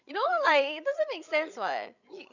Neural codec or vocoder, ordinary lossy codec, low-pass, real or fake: codec, 16 kHz, 8 kbps, FreqCodec, larger model; none; 7.2 kHz; fake